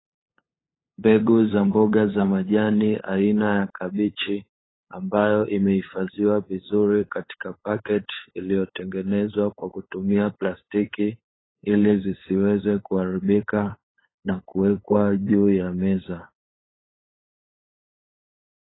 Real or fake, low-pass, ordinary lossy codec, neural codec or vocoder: fake; 7.2 kHz; AAC, 16 kbps; codec, 16 kHz, 8 kbps, FunCodec, trained on LibriTTS, 25 frames a second